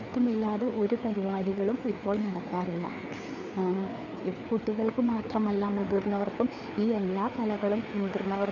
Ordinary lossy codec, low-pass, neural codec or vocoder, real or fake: none; 7.2 kHz; codec, 16 kHz, 4 kbps, FreqCodec, larger model; fake